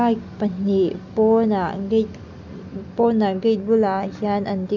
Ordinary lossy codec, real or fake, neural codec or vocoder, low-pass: none; real; none; 7.2 kHz